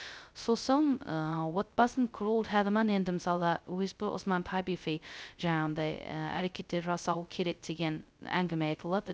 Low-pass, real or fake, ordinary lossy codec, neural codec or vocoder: none; fake; none; codec, 16 kHz, 0.2 kbps, FocalCodec